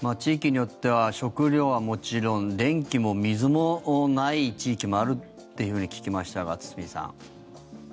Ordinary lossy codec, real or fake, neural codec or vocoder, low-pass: none; real; none; none